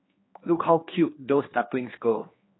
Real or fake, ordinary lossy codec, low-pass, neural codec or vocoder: fake; AAC, 16 kbps; 7.2 kHz; codec, 16 kHz, 2 kbps, X-Codec, HuBERT features, trained on general audio